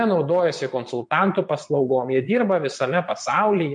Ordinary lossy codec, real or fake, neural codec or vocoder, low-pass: MP3, 48 kbps; fake; vocoder, 22.05 kHz, 80 mel bands, Vocos; 9.9 kHz